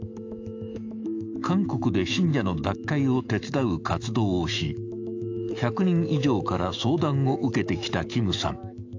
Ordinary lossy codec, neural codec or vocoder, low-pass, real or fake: AAC, 48 kbps; autoencoder, 48 kHz, 128 numbers a frame, DAC-VAE, trained on Japanese speech; 7.2 kHz; fake